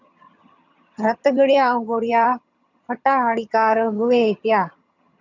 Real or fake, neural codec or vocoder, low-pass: fake; vocoder, 22.05 kHz, 80 mel bands, HiFi-GAN; 7.2 kHz